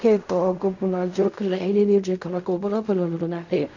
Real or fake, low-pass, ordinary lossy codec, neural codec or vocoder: fake; 7.2 kHz; none; codec, 16 kHz in and 24 kHz out, 0.4 kbps, LongCat-Audio-Codec, fine tuned four codebook decoder